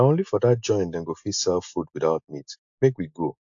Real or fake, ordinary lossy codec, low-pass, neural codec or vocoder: real; AAC, 64 kbps; 7.2 kHz; none